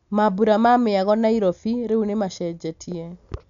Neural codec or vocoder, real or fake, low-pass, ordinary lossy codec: none; real; 7.2 kHz; none